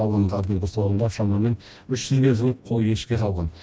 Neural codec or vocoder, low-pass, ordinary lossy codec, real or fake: codec, 16 kHz, 1 kbps, FreqCodec, smaller model; none; none; fake